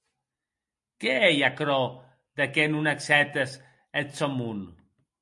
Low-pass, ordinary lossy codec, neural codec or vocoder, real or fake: 10.8 kHz; MP3, 48 kbps; none; real